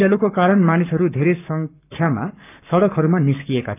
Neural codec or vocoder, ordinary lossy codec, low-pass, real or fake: codec, 44.1 kHz, 7.8 kbps, Pupu-Codec; none; 3.6 kHz; fake